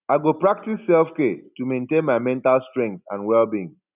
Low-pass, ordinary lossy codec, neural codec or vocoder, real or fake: 3.6 kHz; none; none; real